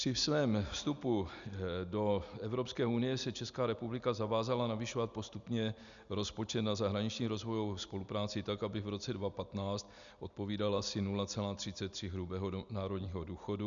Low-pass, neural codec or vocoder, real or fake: 7.2 kHz; none; real